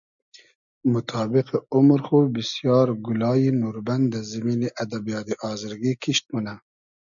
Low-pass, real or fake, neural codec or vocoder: 7.2 kHz; real; none